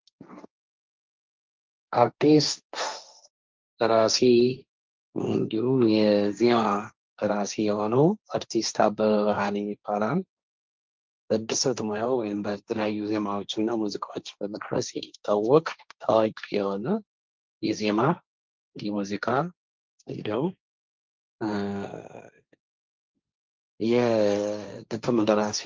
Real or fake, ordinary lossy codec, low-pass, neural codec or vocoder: fake; Opus, 24 kbps; 7.2 kHz; codec, 16 kHz, 1.1 kbps, Voila-Tokenizer